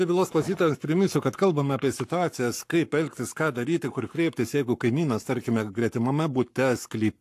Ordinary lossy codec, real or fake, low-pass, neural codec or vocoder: AAC, 64 kbps; fake; 14.4 kHz; codec, 44.1 kHz, 7.8 kbps, Pupu-Codec